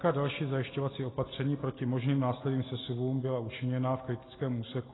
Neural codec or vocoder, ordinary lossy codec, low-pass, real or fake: none; AAC, 16 kbps; 7.2 kHz; real